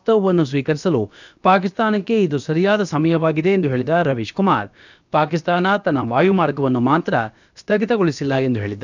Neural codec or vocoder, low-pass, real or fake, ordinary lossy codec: codec, 16 kHz, about 1 kbps, DyCAST, with the encoder's durations; 7.2 kHz; fake; none